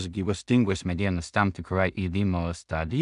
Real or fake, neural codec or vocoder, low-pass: fake; codec, 16 kHz in and 24 kHz out, 0.4 kbps, LongCat-Audio-Codec, two codebook decoder; 10.8 kHz